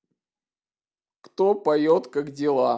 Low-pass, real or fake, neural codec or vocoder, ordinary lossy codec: none; real; none; none